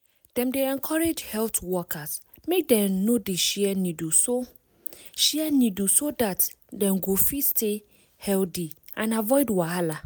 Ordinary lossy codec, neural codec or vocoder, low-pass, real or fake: none; none; none; real